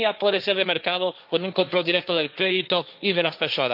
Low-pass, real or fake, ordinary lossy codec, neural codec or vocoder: 5.4 kHz; fake; none; codec, 16 kHz, 1.1 kbps, Voila-Tokenizer